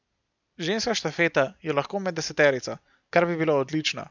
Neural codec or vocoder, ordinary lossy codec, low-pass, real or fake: none; none; 7.2 kHz; real